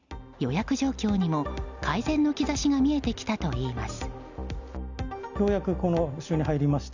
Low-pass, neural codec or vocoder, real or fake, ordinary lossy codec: 7.2 kHz; none; real; none